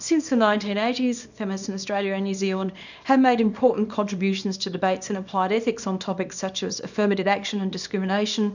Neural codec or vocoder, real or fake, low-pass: codec, 24 kHz, 0.9 kbps, WavTokenizer, small release; fake; 7.2 kHz